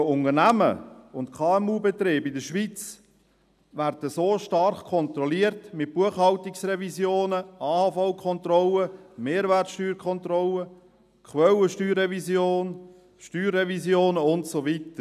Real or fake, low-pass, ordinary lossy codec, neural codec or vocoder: real; 14.4 kHz; none; none